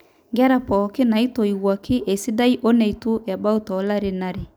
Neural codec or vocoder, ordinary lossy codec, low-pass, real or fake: none; none; none; real